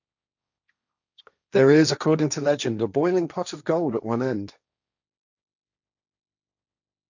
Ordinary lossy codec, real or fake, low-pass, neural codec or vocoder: none; fake; 7.2 kHz; codec, 16 kHz, 1.1 kbps, Voila-Tokenizer